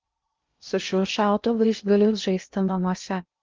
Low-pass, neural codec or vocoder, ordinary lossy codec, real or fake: 7.2 kHz; codec, 16 kHz in and 24 kHz out, 0.6 kbps, FocalCodec, streaming, 2048 codes; Opus, 24 kbps; fake